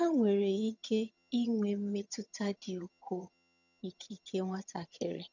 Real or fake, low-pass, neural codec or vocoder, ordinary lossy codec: fake; 7.2 kHz; vocoder, 22.05 kHz, 80 mel bands, HiFi-GAN; none